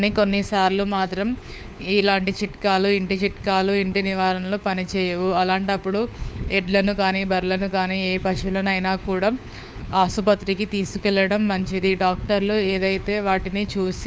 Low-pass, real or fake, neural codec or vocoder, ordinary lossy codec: none; fake; codec, 16 kHz, 4 kbps, FunCodec, trained on LibriTTS, 50 frames a second; none